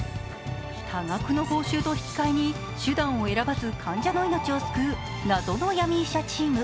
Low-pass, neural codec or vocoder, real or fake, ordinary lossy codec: none; none; real; none